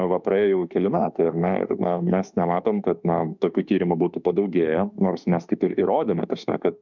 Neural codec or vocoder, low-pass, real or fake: autoencoder, 48 kHz, 32 numbers a frame, DAC-VAE, trained on Japanese speech; 7.2 kHz; fake